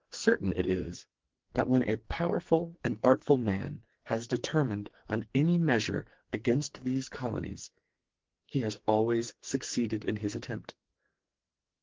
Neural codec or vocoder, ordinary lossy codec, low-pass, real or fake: codec, 44.1 kHz, 2.6 kbps, SNAC; Opus, 16 kbps; 7.2 kHz; fake